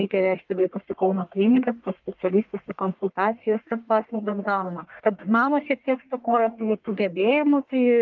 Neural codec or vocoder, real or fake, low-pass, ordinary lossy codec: codec, 44.1 kHz, 1.7 kbps, Pupu-Codec; fake; 7.2 kHz; Opus, 32 kbps